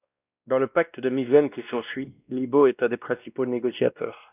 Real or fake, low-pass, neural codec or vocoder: fake; 3.6 kHz; codec, 16 kHz, 1 kbps, X-Codec, WavLM features, trained on Multilingual LibriSpeech